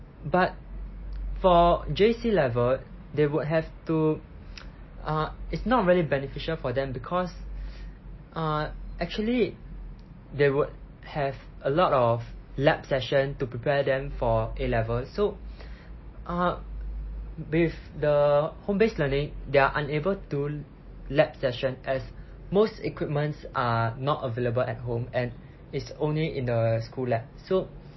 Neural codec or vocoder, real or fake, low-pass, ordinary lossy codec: none; real; 7.2 kHz; MP3, 24 kbps